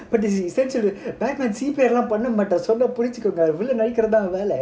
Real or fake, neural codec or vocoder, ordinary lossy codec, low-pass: real; none; none; none